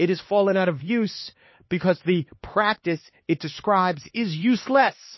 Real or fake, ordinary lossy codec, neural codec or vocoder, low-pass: fake; MP3, 24 kbps; codec, 16 kHz, 2 kbps, X-Codec, HuBERT features, trained on LibriSpeech; 7.2 kHz